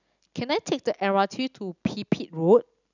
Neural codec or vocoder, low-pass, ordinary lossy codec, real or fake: none; 7.2 kHz; none; real